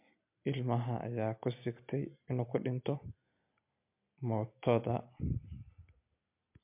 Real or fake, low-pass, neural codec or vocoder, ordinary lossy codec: real; 3.6 kHz; none; MP3, 32 kbps